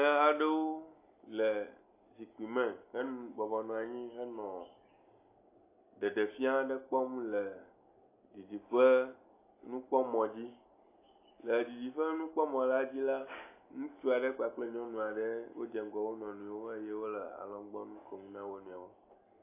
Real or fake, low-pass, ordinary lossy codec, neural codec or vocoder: real; 3.6 kHz; AAC, 24 kbps; none